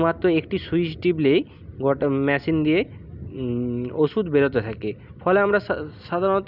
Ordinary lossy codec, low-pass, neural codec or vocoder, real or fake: none; 5.4 kHz; none; real